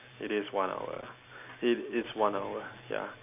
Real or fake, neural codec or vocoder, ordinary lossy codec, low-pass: real; none; AAC, 32 kbps; 3.6 kHz